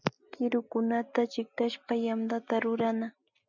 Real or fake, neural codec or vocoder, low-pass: real; none; 7.2 kHz